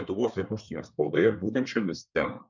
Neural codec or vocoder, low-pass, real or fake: codec, 24 kHz, 1 kbps, SNAC; 7.2 kHz; fake